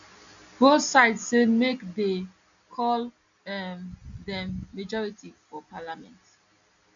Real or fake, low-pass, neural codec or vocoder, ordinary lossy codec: real; 7.2 kHz; none; MP3, 96 kbps